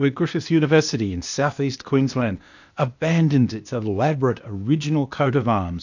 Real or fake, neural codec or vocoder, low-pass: fake; codec, 16 kHz, 0.8 kbps, ZipCodec; 7.2 kHz